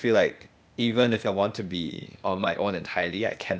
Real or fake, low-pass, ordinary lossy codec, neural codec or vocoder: fake; none; none; codec, 16 kHz, 0.8 kbps, ZipCodec